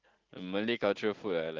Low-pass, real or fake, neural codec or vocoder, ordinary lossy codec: 7.2 kHz; real; none; Opus, 16 kbps